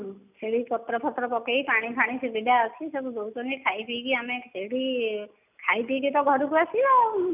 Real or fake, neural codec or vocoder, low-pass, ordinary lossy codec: real; none; 3.6 kHz; none